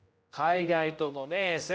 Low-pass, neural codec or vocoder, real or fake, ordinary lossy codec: none; codec, 16 kHz, 0.5 kbps, X-Codec, HuBERT features, trained on general audio; fake; none